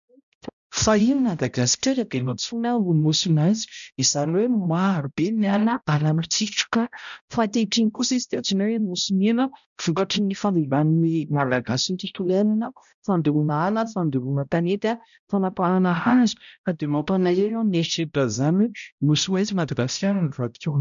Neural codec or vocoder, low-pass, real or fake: codec, 16 kHz, 0.5 kbps, X-Codec, HuBERT features, trained on balanced general audio; 7.2 kHz; fake